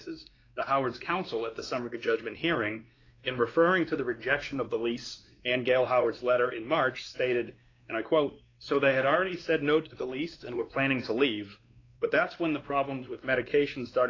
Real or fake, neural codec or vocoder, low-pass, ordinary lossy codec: fake; codec, 16 kHz, 2 kbps, X-Codec, WavLM features, trained on Multilingual LibriSpeech; 7.2 kHz; AAC, 32 kbps